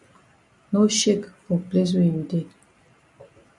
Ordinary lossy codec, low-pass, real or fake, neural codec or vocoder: MP3, 64 kbps; 10.8 kHz; real; none